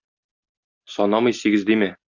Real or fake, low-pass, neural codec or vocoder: real; 7.2 kHz; none